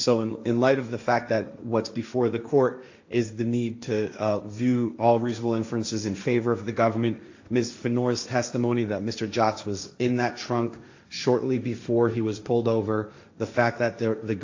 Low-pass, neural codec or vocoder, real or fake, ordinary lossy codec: 7.2 kHz; codec, 16 kHz, 1.1 kbps, Voila-Tokenizer; fake; AAC, 48 kbps